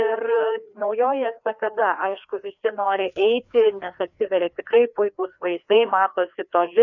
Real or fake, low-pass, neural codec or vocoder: fake; 7.2 kHz; codec, 16 kHz, 2 kbps, FreqCodec, larger model